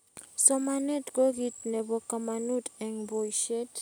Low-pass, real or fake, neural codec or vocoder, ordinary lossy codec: none; real; none; none